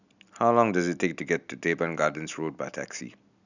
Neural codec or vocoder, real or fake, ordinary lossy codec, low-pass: none; real; none; 7.2 kHz